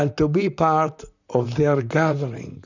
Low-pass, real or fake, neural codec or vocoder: 7.2 kHz; fake; vocoder, 44.1 kHz, 128 mel bands, Pupu-Vocoder